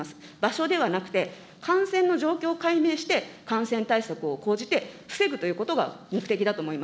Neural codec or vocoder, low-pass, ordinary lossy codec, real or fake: none; none; none; real